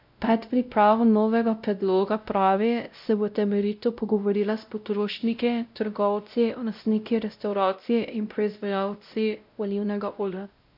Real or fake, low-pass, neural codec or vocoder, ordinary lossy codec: fake; 5.4 kHz; codec, 16 kHz, 0.5 kbps, X-Codec, WavLM features, trained on Multilingual LibriSpeech; none